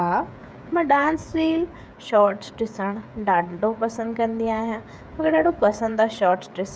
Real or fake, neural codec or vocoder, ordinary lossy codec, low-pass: fake; codec, 16 kHz, 16 kbps, FreqCodec, smaller model; none; none